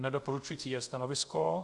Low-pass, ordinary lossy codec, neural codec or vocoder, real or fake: 10.8 kHz; Opus, 64 kbps; codec, 24 kHz, 0.5 kbps, DualCodec; fake